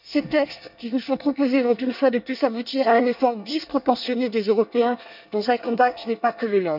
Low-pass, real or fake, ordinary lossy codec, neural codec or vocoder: 5.4 kHz; fake; none; codec, 24 kHz, 1 kbps, SNAC